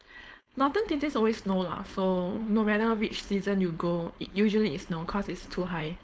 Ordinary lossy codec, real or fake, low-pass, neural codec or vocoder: none; fake; none; codec, 16 kHz, 4.8 kbps, FACodec